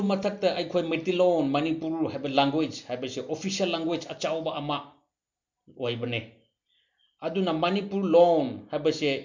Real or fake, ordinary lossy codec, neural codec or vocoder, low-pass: real; MP3, 64 kbps; none; 7.2 kHz